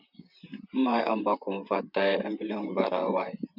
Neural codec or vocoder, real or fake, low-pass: vocoder, 44.1 kHz, 128 mel bands, Pupu-Vocoder; fake; 5.4 kHz